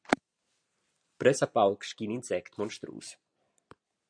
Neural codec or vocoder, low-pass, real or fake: none; 9.9 kHz; real